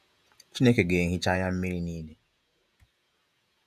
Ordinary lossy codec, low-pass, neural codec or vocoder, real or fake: none; 14.4 kHz; vocoder, 48 kHz, 128 mel bands, Vocos; fake